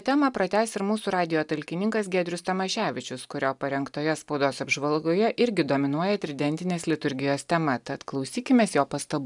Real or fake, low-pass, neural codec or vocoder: real; 10.8 kHz; none